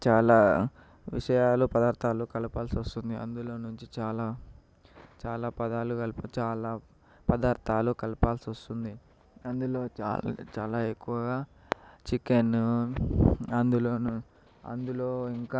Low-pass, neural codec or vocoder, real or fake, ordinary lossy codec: none; none; real; none